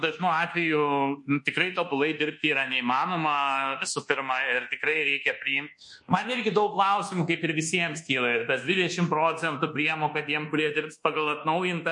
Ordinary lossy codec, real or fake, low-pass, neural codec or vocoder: MP3, 48 kbps; fake; 10.8 kHz; codec, 24 kHz, 1.2 kbps, DualCodec